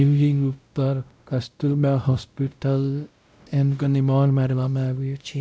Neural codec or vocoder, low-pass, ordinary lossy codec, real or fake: codec, 16 kHz, 0.5 kbps, X-Codec, WavLM features, trained on Multilingual LibriSpeech; none; none; fake